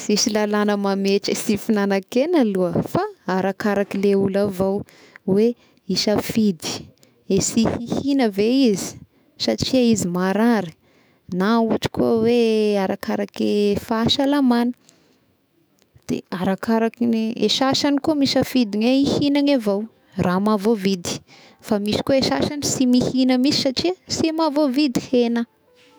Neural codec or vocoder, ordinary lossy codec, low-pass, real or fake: autoencoder, 48 kHz, 128 numbers a frame, DAC-VAE, trained on Japanese speech; none; none; fake